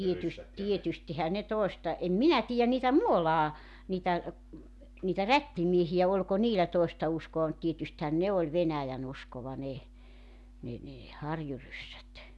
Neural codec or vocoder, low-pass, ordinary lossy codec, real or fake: none; none; none; real